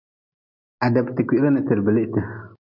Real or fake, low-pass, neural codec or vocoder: real; 5.4 kHz; none